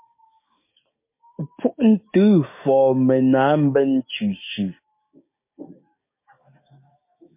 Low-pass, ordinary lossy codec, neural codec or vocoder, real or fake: 3.6 kHz; MP3, 24 kbps; codec, 16 kHz, 6 kbps, DAC; fake